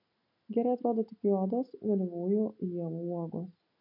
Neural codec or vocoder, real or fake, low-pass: none; real; 5.4 kHz